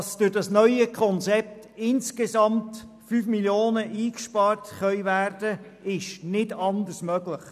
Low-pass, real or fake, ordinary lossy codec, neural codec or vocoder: 14.4 kHz; real; none; none